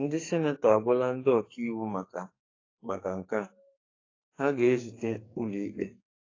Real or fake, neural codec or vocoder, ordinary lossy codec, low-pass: fake; codec, 44.1 kHz, 2.6 kbps, SNAC; AAC, 32 kbps; 7.2 kHz